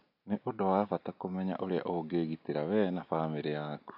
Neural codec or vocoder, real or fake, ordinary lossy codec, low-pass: none; real; none; 5.4 kHz